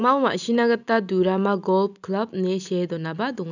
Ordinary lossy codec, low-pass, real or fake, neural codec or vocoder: none; 7.2 kHz; real; none